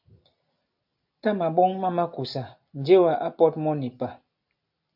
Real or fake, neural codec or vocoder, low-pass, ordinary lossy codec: real; none; 5.4 kHz; AAC, 48 kbps